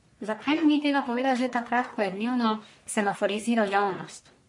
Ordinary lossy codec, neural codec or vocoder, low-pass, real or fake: MP3, 48 kbps; codec, 44.1 kHz, 1.7 kbps, Pupu-Codec; 10.8 kHz; fake